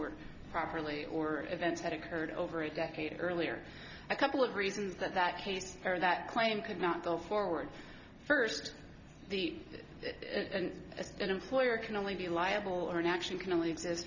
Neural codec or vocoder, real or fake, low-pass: none; real; 7.2 kHz